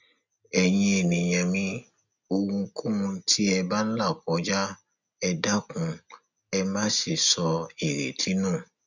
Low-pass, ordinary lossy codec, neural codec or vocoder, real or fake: 7.2 kHz; none; none; real